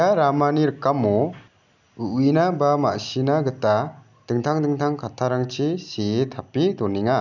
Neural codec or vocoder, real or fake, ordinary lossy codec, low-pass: none; real; none; 7.2 kHz